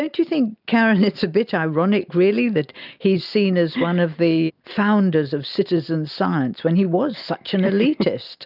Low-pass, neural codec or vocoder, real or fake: 5.4 kHz; none; real